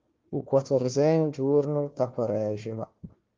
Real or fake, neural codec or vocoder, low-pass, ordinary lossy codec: fake; codec, 16 kHz, 1 kbps, FunCodec, trained on Chinese and English, 50 frames a second; 7.2 kHz; Opus, 24 kbps